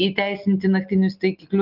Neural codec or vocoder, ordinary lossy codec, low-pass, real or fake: none; Opus, 24 kbps; 5.4 kHz; real